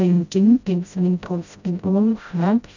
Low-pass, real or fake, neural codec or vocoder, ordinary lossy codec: 7.2 kHz; fake; codec, 16 kHz, 0.5 kbps, FreqCodec, smaller model; none